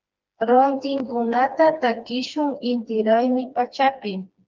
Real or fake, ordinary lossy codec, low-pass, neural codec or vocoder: fake; Opus, 24 kbps; 7.2 kHz; codec, 16 kHz, 2 kbps, FreqCodec, smaller model